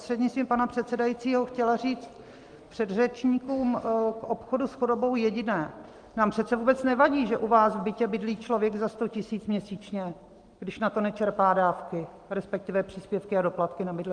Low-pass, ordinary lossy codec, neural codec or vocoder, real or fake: 9.9 kHz; Opus, 24 kbps; none; real